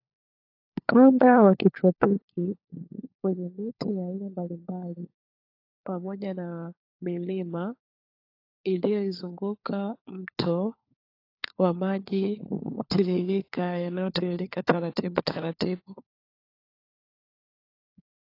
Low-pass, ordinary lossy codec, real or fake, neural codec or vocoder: 5.4 kHz; AAC, 32 kbps; fake; codec, 16 kHz, 4 kbps, FunCodec, trained on LibriTTS, 50 frames a second